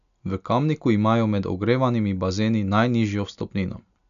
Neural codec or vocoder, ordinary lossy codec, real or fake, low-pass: none; none; real; 7.2 kHz